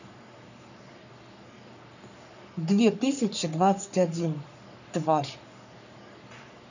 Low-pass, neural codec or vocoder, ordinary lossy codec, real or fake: 7.2 kHz; codec, 44.1 kHz, 3.4 kbps, Pupu-Codec; none; fake